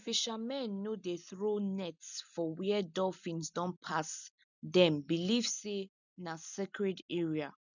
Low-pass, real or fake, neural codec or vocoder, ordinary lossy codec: 7.2 kHz; real; none; none